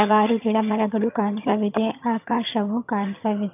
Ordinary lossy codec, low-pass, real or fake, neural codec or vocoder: none; 3.6 kHz; fake; vocoder, 22.05 kHz, 80 mel bands, HiFi-GAN